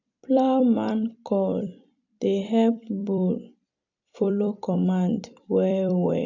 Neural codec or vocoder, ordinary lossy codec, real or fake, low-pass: vocoder, 44.1 kHz, 128 mel bands every 256 samples, BigVGAN v2; none; fake; 7.2 kHz